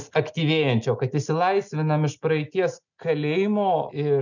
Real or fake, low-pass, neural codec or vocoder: real; 7.2 kHz; none